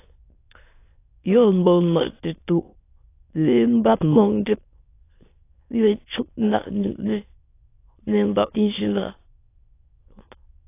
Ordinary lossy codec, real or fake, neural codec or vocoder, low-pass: AAC, 24 kbps; fake; autoencoder, 22.05 kHz, a latent of 192 numbers a frame, VITS, trained on many speakers; 3.6 kHz